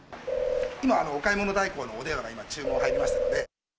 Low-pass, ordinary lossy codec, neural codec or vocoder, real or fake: none; none; none; real